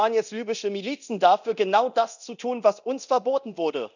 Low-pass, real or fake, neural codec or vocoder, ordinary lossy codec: 7.2 kHz; fake; codec, 24 kHz, 0.9 kbps, DualCodec; none